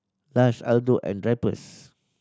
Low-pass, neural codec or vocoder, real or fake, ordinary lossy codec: none; none; real; none